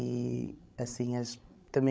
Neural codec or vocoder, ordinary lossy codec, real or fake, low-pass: codec, 16 kHz, 16 kbps, FreqCodec, larger model; none; fake; none